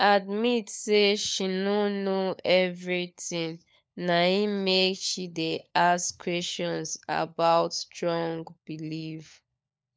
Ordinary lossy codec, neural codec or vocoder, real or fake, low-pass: none; codec, 16 kHz, 4 kbps, FunCodec, trained on LibriTTS, 50 frames a second; fake; none